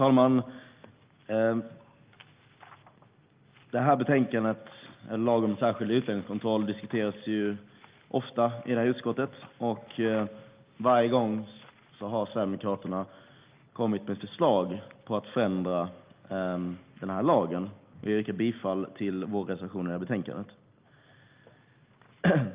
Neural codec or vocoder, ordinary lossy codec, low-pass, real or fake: none; Opus, 24 kbps; 3.6 kHz; real